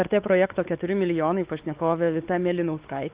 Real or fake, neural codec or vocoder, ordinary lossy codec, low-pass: fake; codec, 16 kHz, 4 kbps, X-Codec, WavLM features, trained on Multilingual LibriSpeech; Opus, 32 kbps; 3.6 kHz